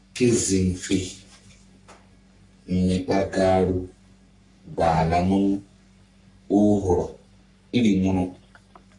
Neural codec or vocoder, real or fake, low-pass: codec, 44.1 kHz, 3.4 kbps, Pupu-Codec; fake; 10.8 kHz